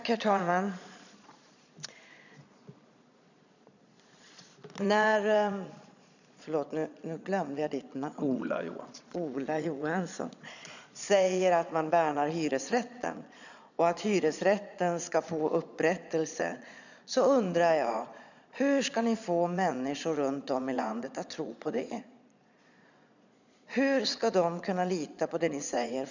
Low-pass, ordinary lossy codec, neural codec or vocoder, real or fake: 7.2 kHz; none; vocoder, 22.05 kHz, 80 mel bands, Vocos; fake